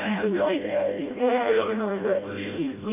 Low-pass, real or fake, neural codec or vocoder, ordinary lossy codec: 3.6 kHz; fake; codec, 16 kHz, 0.5 kbps, FreqCodec, smaller model; MP3, 24 kbps